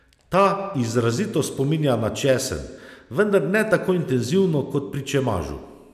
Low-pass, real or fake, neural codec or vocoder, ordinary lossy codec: 14.4 kHz; real; none; none